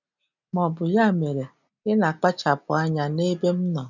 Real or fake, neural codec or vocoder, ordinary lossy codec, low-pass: real; none; none; 7.2 kHz